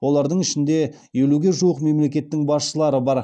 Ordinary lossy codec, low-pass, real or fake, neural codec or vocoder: none; 9.9 kHz; real; none